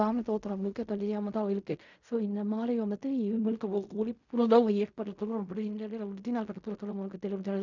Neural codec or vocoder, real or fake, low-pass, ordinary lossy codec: codec, 16 kHz in and 24 kHz out, 0.4 kbps, LongCat-Audio-Codec, fine tuned four codebook decoder; fake; 7.2 kHz; none